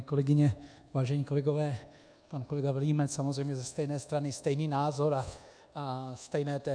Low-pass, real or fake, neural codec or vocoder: 9.9 kHz; fake; codec, 24 kHz, 1.2 kbps, DualCodec